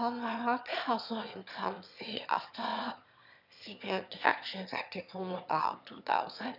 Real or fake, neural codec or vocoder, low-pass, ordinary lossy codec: fake; autoencoder, 22.05 kHz, a latent of 192 numbers a frame, VITS, trained on one speaker; 5.4 kHz; none